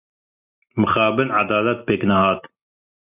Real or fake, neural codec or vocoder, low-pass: real; none; 3.6 kHz